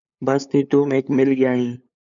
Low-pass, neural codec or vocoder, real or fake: 7.2 kHz; codec, 16 kHz, 8 kbps, FunCodec, trained on LibriTTS, 25 frames a second; fake